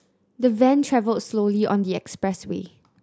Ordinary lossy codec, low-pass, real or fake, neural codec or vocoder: none; none; real; none